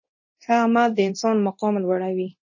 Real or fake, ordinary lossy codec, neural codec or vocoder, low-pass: fake; MP3, 32 kbps; codec, 24 kHz, 0.9 kbps, DualCodec; 7.2 kHz